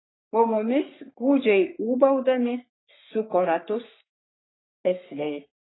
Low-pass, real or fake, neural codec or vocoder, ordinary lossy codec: 7.2 kHz; fake; codec, 44.1 kHz, 3.4 kbps, Pupu-Codec; AAC, 16 kbps